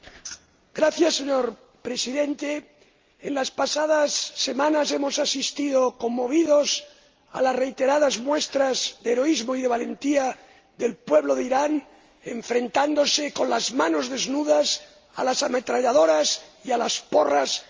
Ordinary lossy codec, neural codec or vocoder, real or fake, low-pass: Opus, 32 kbps; none; real; 7.2 kHz